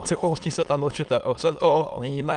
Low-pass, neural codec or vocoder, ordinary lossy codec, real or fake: 9.9 kHz; autoencoder, 22.05 kHz, a latent of 192 numbers a frame, VITS, trained on many speakers; Opus, 32 kbps; fake